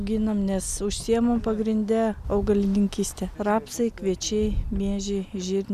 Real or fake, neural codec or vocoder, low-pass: real; none; 14.4 kHz